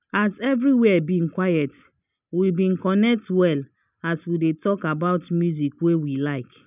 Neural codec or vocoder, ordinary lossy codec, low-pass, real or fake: none; none; 3.6 kHz; real